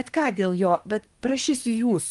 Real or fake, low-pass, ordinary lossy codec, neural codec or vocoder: fake; 10.8 kHz; Opus, 32 kbps; codec, 24 kHz, 1 kbps, SNAC